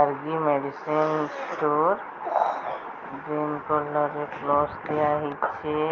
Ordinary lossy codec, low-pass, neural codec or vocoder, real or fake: Opus, 24 kbps; 7.2 kHz; none; real